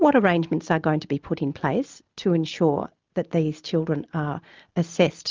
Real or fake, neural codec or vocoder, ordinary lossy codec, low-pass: real; none; Opus, 24 kbps; 7.2 kHz